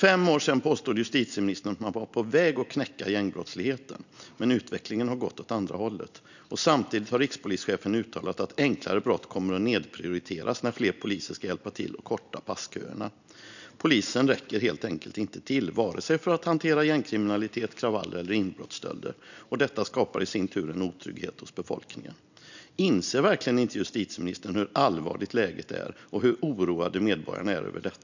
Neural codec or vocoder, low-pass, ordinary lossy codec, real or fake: none; 7.2 kHz; none; real